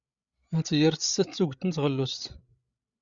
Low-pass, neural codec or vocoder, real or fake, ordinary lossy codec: 7.2 kHz; codec, 16 kHz, 16 kbps, FreqCodec, larger model; fake; Opus, 64 kbps